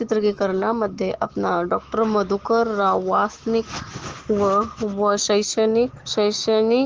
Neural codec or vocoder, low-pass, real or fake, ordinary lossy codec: autoencoder, 48 kHz, 128 numbers a frame, DAC-VAE, trained on Japanese speech; 7.2 kHz; fake; Opus, 16 kbps